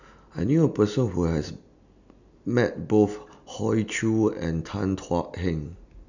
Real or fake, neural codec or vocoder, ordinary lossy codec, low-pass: real; none; none; 7.2 kHz